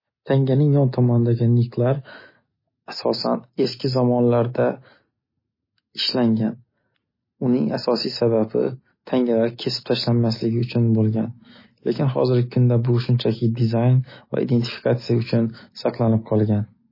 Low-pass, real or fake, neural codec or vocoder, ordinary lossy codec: 5.4 kHz; real; none; MP3, 24 kbps